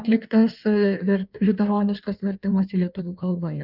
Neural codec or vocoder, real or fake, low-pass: codec, 16 kHz in and 24 kHz out, 1.1 kbps, FireRedTTS-2 codec; fake; 5.4 kHz